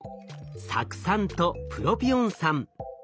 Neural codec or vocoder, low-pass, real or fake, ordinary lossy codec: none; none; real; none